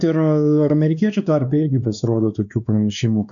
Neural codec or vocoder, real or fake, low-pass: codec, 16 kHz, 2 kbps, X-Codec, WavLM features, trained on Multilingual LibriSpeech; fake; 7.2 kHz